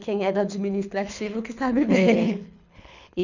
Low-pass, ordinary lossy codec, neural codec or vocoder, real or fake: 7.2 kHz; none; codec, 24 kHz, 6 kbps, HILCodec; fake